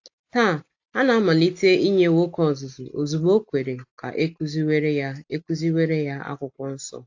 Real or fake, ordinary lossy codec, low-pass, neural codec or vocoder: real; AAC, 48 kbps; 7.2 kHz; none